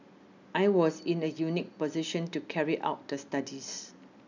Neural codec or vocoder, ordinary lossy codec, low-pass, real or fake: none; none; 7.2 kHz; real